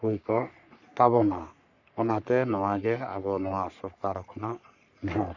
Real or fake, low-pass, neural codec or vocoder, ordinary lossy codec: fake; 7.2 kHz; codec, 44.1 kHz, 3.4 kbps, Pupu-Codec; none